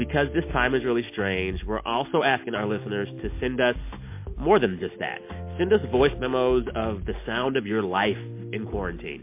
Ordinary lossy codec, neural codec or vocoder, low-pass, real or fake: MP3, 24 kbps; none; 3.6 kHz; real